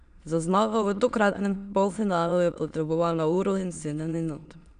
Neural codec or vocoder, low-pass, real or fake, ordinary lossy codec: autoencoder, 22.05 kHz, a latent of 192 numbers a frame, VITS, trained on many speakers; 9.9 kHz; fake; none